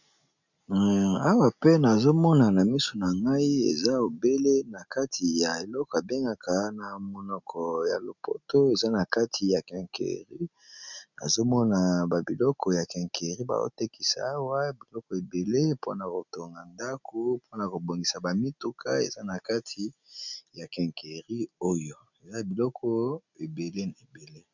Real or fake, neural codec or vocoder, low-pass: real; none; 7.2 kHz